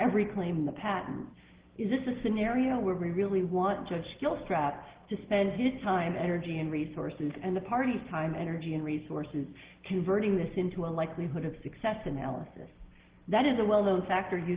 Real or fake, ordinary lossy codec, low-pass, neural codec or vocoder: real; Opus, 16 kbps; 3.6 kHz; none